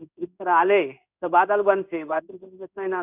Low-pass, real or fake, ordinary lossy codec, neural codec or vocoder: 3.6 kHz; fake; none; codec, 16 kHz in and 24 kHz out, 1 kbps, XY-Tokenizer